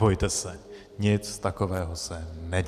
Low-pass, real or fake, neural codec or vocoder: 14.4 kHz; real; none